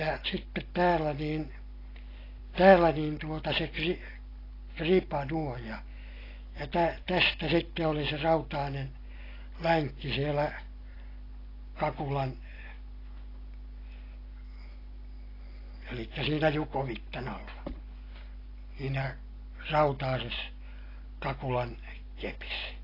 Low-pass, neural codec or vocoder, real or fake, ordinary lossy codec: 5.4 kHz; none; real; AAC, 24 kbps